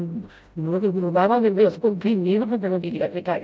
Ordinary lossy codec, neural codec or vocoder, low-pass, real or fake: none; codec, 16 kHz, 0.5 kbps, FreqCodec, smaller model; none; fake